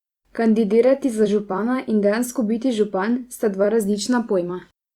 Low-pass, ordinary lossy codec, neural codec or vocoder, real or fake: 19.8 kHz; Opus, 64 kbps; vocoder, 44.1 kHz, 128 mel bands every 256 samples, BigVGAN v2; fake